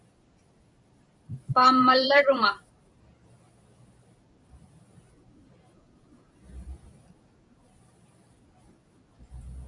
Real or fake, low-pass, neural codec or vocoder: fake; 10.8 kHz; vocoder, 44.1 kHz, 128 mel bands every 256 samples, BigVGAN v2